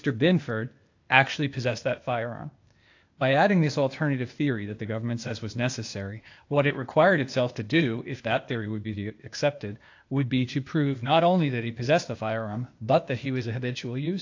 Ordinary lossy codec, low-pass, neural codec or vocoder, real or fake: AAC, 48 kbps; 7.2 kHz; codec, 16 kHz, 0.8 kbps, ZipCodec; fake